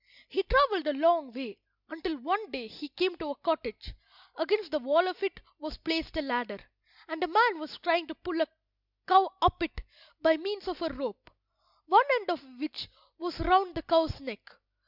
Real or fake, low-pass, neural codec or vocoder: real; 5.4 kHz; none